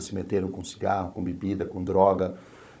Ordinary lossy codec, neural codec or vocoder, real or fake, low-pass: none; codec, 16 kHz, 16 kbps, FunCodec, trained on Chinese and English, 50 frames a second; fake; none